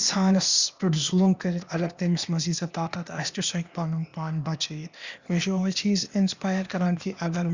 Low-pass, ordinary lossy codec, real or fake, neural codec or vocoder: 7.2 kHz; Opus, 64 kbps; fake; codec, 16 kHz, 0.8 kbps, ZipCodec